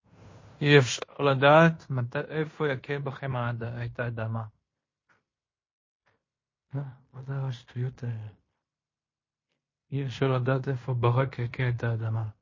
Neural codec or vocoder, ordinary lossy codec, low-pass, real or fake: codec, 16 kHz in and 24 kHz out, 0.9 kbps, LongCat-Audio-Codec, fine tuned four codebook decoder; MP3, 32 kbps; 7.2 kHz; fake